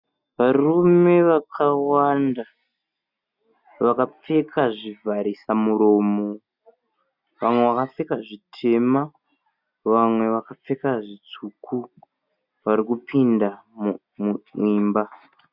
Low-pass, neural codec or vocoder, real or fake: 5.4 kHz; none; real